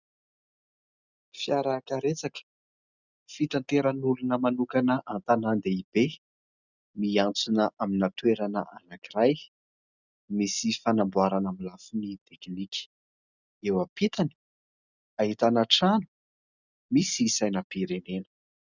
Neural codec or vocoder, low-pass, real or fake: none; 7.2 kHz; real